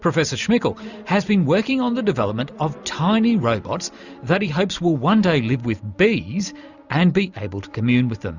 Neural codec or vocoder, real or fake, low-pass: none; real; 7.2 kHz